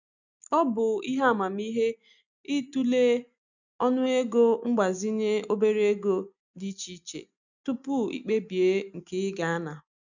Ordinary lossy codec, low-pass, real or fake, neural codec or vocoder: none; 7.2 kHz; real; none